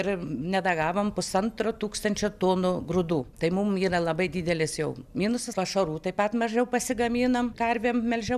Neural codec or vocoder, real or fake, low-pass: none; real; 14.4 kHz